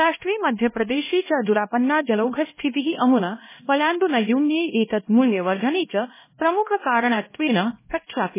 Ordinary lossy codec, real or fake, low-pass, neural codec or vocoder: MP3, 16 kbps; fake; 3.6 kHz; codec, 16 kHz, 1 kbps, X-Codec, HuBERT features, trained on LibriSpeech